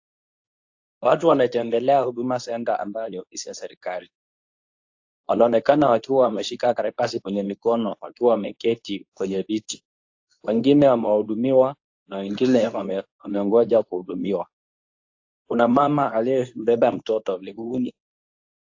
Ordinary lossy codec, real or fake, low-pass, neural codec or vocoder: AAC, 48 kbps; fake; 7.2 kHz; codec, 24 kHz, 0.9 kbps, WavTokenizer, medium speech release version 2